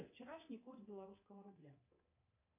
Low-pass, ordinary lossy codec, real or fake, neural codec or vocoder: 3.6 kHz; MP3, 32 kbps; fake; codec, 24 kHz, 3.1 kbps, DualCodec